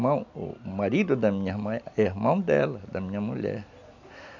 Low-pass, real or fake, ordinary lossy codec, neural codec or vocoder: 7.2 kHz; real; none; none